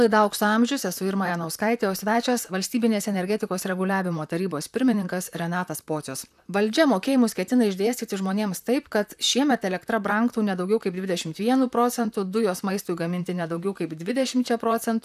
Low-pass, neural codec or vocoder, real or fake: 14.4 kHz; vocoder, 44.1 kHz, 128 mel bands, Pupu-Vocoder; fake